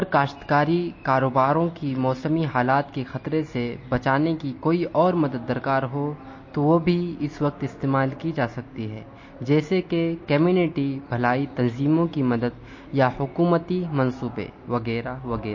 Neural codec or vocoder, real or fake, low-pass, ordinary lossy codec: none; real; 7.2 kHz; MP3, 32 kbps